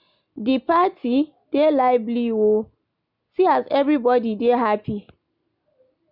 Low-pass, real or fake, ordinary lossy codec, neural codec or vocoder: 5.4 kHz; real; none; none